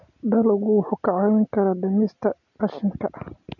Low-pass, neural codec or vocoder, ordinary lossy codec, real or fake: 7.2 kHz; none; none; real